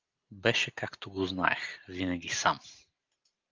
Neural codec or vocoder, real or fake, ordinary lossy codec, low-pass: none; real; Opus, 32 kbps; 7.2 kHz